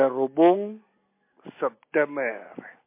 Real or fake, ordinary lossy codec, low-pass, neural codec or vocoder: real; MP3, 24 kbps; 3.6 kHz; none